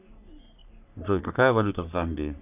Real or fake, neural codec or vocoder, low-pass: fake; codec, 44.1 kHz, 3.4 kbps, Pupu-Codec; 3.6 kHz